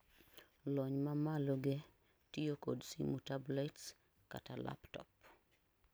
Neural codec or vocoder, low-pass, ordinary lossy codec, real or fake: vocoder, 44.1 kHz, 128 mel bands every 256 samples, BigVGAN v2; none; none; fake